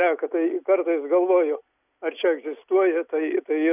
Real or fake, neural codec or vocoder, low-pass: real; none; 3.6 kHz